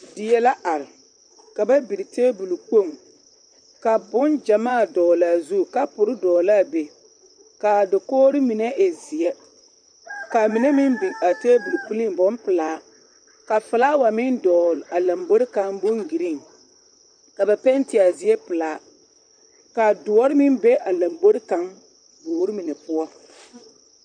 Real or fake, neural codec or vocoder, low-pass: fake; vocoder, 44.1 kHz, 128 mel bands, Pupu-Vocoder; 9.9 kHz